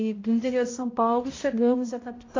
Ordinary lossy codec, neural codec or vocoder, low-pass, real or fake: AAC, 32 kbps; codec, 16 kHz, 0.5 kbps, X-Codec, HuBERT features, trained on balanced general audio; 7.2 kHz; fake